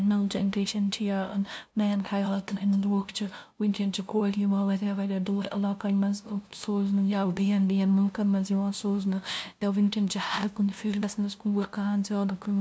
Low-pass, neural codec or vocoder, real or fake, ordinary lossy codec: none; codec, 16 kHz, 0.5 kbps, FunCodec, trained on LibriTTS, 25 frames a second; fake; none